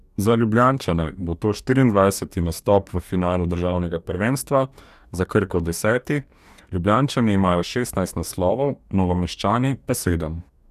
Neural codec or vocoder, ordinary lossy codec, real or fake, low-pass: codec, 44.1 kHz, 2.6 kbps, DAC; none; fake; 14.4 kHz